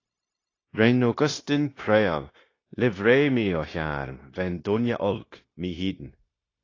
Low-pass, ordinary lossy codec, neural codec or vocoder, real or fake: 7.2 kHz; AAC, 32 kbps; codec, 16 kHz, 0.9 kbps, LongCat-Audio-Codec; fake